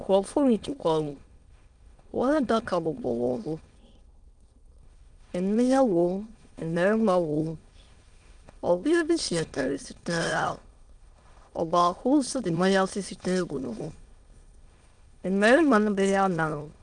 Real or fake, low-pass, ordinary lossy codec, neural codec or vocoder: fake; 9.9 kHz; Opus, 32 kbps; autoencoder, 22.05 kHz, a latent of 192 numbers a frame, VITS, trained on many speakers